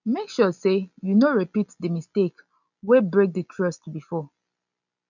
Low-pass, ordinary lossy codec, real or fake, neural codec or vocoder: 7.2 kHz; none; real; none